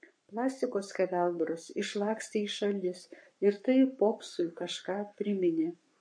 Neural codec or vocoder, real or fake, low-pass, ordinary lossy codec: codec, 44.1 kHz, 7.8 kbps, Pupu-Codec; fake; 9.9 kHz; MP3, 48 kbps